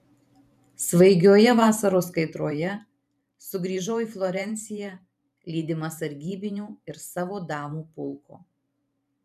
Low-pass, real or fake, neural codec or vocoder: 14.4 kHz; fake; vocoder, 44.1 kHz, 128 mel bands every 512 samples, BigVGAN v2